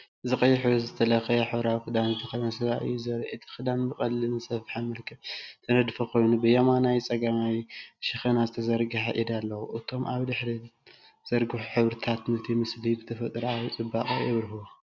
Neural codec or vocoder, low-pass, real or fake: none; 7.2 kHz; real